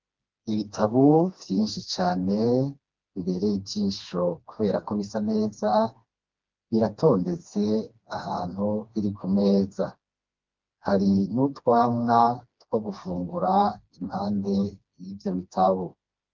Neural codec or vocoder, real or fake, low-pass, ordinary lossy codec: codec, 16 kHz, 2 kbps, FreqCodec, smaller model; fake; 7.2 kHz; Opus, 24 kbps